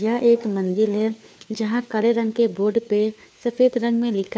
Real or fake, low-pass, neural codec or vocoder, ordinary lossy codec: fake; none; codec, 16 kHz, 4 kbps, FunCodec, trained on LibriTTS, 50 frames a second; none